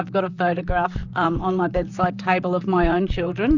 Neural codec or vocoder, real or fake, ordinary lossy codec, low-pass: vocoder, 44.1 kHz, 128 mel bands, Pupu-Vocoder; fake; Opus, 64 kbps; 7.2 kHz